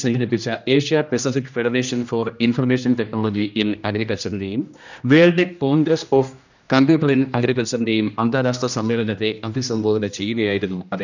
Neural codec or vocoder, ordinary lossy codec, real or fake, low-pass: codec, 16 kHz, 1 kbps, X-Codec, HuBERT features, trained on general audio; none; fake; 7.2 kHz